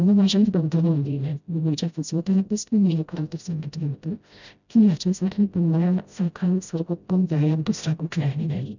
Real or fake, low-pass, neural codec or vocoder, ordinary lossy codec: fake; 7.2 kHz; codec, 16 kHz, 0.5 kbps, FreqCodec, smaller model; none